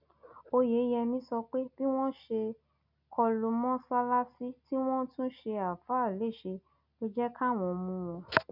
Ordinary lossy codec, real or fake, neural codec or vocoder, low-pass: none; real; none; 5.4 kHz